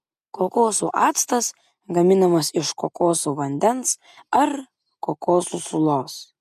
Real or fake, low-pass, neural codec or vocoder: real; 14.4 kHz; none